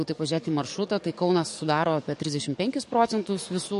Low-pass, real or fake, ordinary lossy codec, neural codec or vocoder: 14.4 kHz; fake; MP3, 48 kbps; codec, 44.1 kHz, 7.8 kbps, DAC